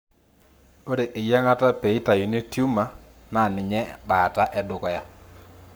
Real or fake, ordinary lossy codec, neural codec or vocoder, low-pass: fake; none; codec, 44.1 kHz, 7.8 kbps, Pupu-Codec; none